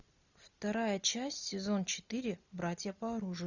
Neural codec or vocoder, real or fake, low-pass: none; real; 7.2 kHz